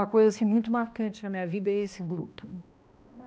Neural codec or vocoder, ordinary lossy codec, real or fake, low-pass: codec, 16 kHz, 1 kbps, X-Codec, HuBERT features, trained on balanced general audio; none; fake; none